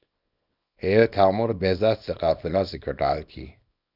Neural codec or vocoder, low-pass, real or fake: codec, 24 kHz, 0.9 kbps, WavTokenizer, small release; 5.4 kHz; fake